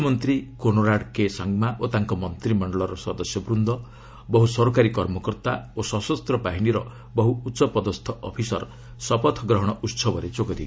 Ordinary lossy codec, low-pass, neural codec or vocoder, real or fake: none; none; none; real